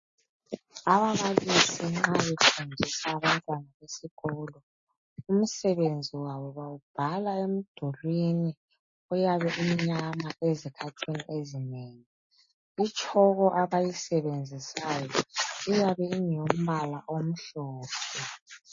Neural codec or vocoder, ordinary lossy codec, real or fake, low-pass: none; MP3, 32 kbps; real; 7.2 kHz